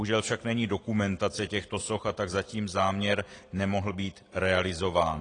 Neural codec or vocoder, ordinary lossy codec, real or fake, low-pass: none; AAC, 32 kbps; real; 9.9 kHz